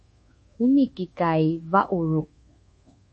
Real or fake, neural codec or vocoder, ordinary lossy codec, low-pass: fake; codec, 24 kHz, 1.2 kbps, DualCodec; MP3, 32 kbps; 10.8 kHz